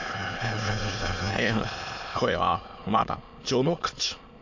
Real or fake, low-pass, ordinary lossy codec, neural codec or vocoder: fake; 7.2 kHz; MP3, 48 kbps; autoencoder, 22.05 kHz, a latent of 192 numbers a frame, VITS, trained on many speakers